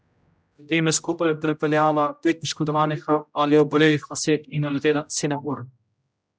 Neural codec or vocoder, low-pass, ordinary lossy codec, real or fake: codec, 16 kHz, 0.5 kbps, X-Codec, HuBERT features, trained on general audio; none; none; fake